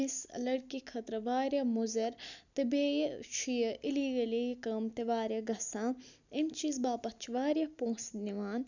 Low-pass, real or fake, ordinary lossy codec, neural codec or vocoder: 7.2 kHz; real; none; none